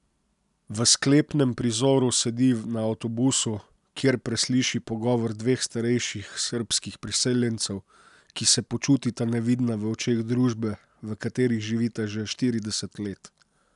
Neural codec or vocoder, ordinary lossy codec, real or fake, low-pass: none; none; real; 10.8 kHz